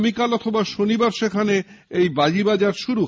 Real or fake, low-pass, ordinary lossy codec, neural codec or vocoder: real; none; none; none